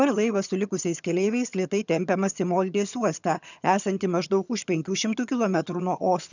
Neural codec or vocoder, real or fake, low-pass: vocoder, 22.05 kHz, 80 mel bands, HiFi-GAN; fake; 7.2 kHz